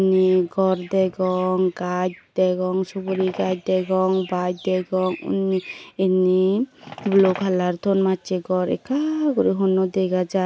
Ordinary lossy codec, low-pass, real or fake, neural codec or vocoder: none; none; real; none